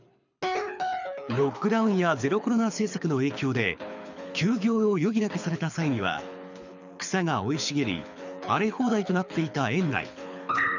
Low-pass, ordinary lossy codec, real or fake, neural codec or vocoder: 7.2 kHz; none; fake; codec, 24 kHz, 6 kbps, HILCodec